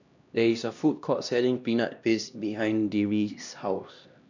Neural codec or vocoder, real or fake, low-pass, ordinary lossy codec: codec, 16 kHz, 1 kbps, X-Codec, HuBERT features, trained on LibriSpeech; fake; 7.2 kHz; none